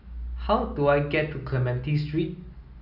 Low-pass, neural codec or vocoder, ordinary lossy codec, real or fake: 5.4 kHz; none; none; real